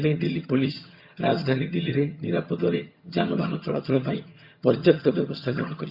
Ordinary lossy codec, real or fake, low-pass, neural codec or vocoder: Opus, 64 kbps; fake; 5.4 kHz; vocoder, 22.05 kHz, 80 mel bands, HiFi-GAN